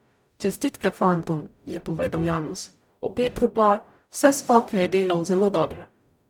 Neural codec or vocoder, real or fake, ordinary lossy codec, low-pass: codec, 44.1 kHz, 0.9 kbps, DAC; fake; none; 19.8 kHz